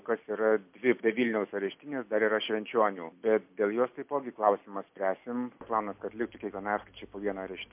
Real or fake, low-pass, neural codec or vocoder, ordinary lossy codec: real; 3.6 kHz; none; MP3, 32 kbps